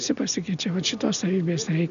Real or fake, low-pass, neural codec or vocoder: real; 7.2 kHz; none